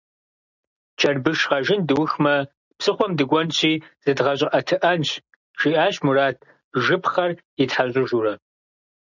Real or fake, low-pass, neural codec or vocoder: real; 7.2 kHz; none